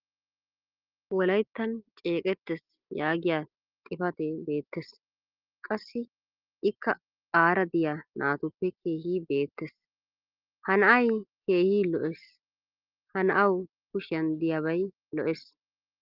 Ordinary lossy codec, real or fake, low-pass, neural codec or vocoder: Opus, 32 kbps; real; 5.4 kHz; none